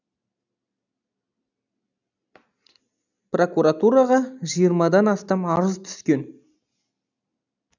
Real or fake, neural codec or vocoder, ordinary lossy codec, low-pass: real; none; none; 7.2 kHz